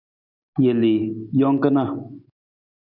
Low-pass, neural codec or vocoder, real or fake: 5.4 kHz; none; real